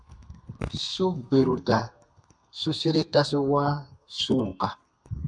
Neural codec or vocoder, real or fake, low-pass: codec, 32 kHz, 1.9 kbps, SNAC; fake; 9.9 kHz